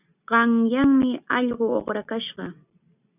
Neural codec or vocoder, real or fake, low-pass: none; real; 3.6 kHz